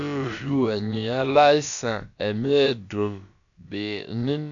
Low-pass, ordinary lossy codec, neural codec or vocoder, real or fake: 7.2 kHz; none; codec, 16 kHz, about 1 kbps, DyCAST, with the encoder's durations; fake